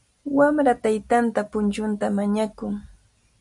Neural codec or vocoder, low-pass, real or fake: none; 10.8 kHz; real